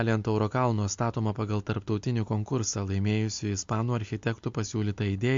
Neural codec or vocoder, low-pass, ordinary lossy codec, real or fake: none; 7.2 kHz; MP3, 48 kbps; real